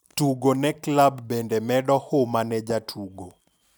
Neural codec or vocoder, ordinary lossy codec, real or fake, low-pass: none; none; real; none